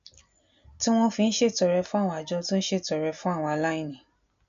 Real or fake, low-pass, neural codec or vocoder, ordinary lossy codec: real; 7.2 kHz; none; none